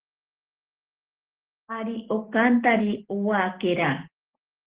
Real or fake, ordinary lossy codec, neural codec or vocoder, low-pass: real; Opus, 16 kbps; none; 3.6 kHz